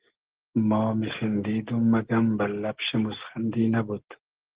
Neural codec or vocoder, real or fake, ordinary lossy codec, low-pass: none; real; Opus, 16 kbps; 3.6 kHz